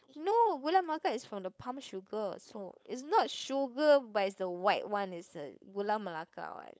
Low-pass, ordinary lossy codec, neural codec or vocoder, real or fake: none; none; codec, 16 kHz, 4.8 kbps, FACodec; fake